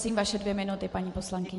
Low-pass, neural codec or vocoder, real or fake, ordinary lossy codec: 14.4 kHz; vocoder, 44.1 kHz, 128 mel bands every 512 samples, BigVGAN v2; fake; MP3, 48 kbps